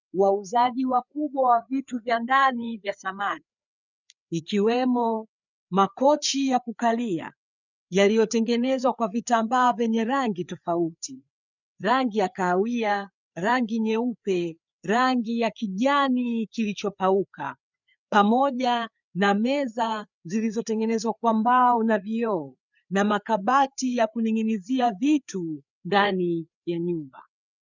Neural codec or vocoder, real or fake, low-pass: codec, 16 kHz, 4 kbps, FreqCodec, larger model; fake; 7.2 kHz